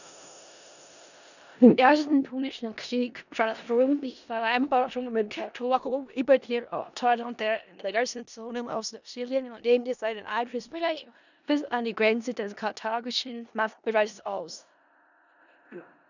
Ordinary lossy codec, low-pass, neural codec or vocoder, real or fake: none; 7.2 kHz; codec, 16 kHz in and 24 kHz out, 0.4 kbps, LongCat-Audio-Codec, four codebook decoder; fake